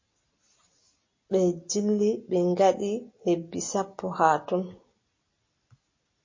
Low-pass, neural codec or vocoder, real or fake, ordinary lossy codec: 7.2 kHz; none; real; MP3, 32 kbps